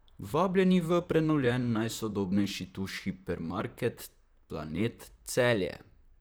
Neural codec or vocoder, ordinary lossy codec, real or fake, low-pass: vocoder, 44.1 kHz, 128 mel bands, Pupu-Vocoder; none; fake; none